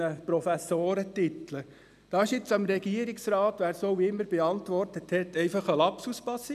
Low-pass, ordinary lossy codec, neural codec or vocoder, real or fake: 14.4 kHz; none; none; real